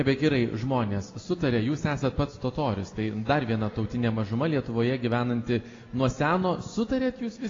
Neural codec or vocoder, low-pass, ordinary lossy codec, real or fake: none; 7.2 kHz; AAC, 32 kbps; real